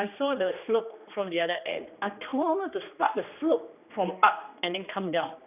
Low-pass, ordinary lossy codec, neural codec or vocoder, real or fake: 3.6 kHz; none; codec, 16 kHz, 2 kbps, X-Codec, HuBERT features, trained on general audio; fake